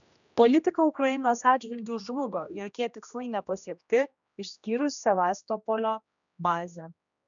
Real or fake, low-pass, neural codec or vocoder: fake; 7.2 kHz; codec, 16 kHz, 1 kbps, X-Codec, HuBERT features, trained on general audio